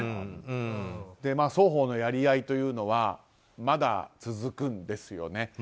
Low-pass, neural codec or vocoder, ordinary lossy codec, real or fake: none; none; none; real